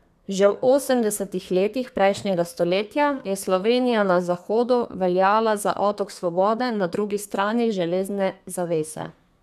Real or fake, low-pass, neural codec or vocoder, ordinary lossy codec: fake; 14.4 kHz; codec, 32 kHz, 1.9 kbps, SNAC; none